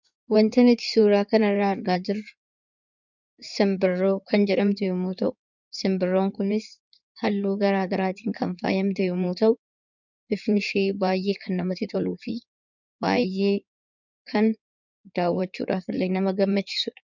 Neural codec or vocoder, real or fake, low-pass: codec, 16 kHz in and 24 kHz out, 2.2 kbps, FireRedTTS-2 codec; fake; 7.2 kHz